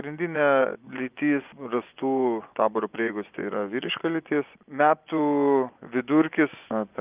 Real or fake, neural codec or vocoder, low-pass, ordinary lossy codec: fake; vocoder, 24 kHz, 100 mel bands, Vocos; 3.6 kHz; Opus, 24 kbps